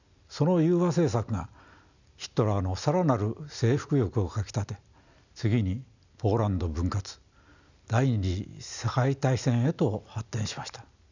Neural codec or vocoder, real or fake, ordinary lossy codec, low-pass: none; real; none; 7.2 kHz